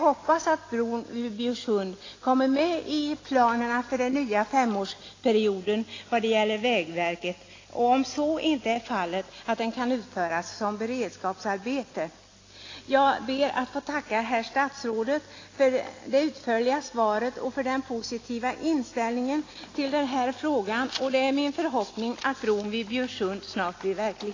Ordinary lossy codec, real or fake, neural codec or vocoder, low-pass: AAC, 32 kbps; real; none; 7.2 kHz